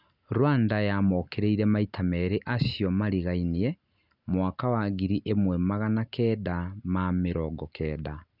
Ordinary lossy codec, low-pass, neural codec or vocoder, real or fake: none; 5.4 kHz; none; real